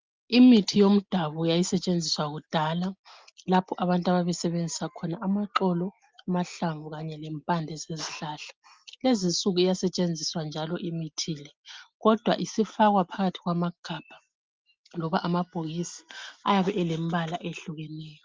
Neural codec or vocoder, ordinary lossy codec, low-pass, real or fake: none; Opus, 24 kbps; 7.2 kHz; real